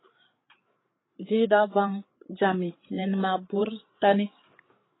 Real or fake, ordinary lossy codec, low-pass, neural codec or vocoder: fake; AAC, 16 kbps; 7.2 kHz; codec, 16 kHz, 8 kbps, FreqCodec, larger model